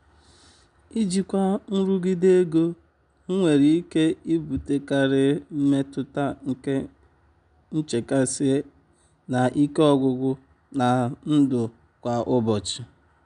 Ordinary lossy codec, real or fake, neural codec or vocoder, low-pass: none; real; none; 9.9 kHz